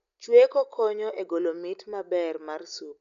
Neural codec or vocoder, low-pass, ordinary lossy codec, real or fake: none; 7.2 kHz; none; real